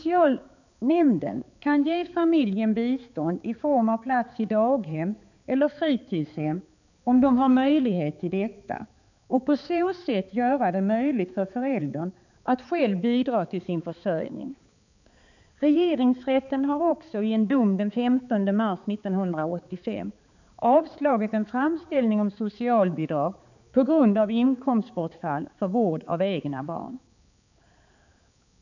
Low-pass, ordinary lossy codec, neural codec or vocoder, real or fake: 7.2 kHz; none; codec, 16 kHz, 4 kbps, X-Codec, HuBERT features, trained on balanced general audio; fake